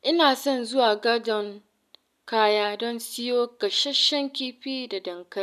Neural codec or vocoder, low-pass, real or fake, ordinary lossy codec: none; 14.4 kHz; real; none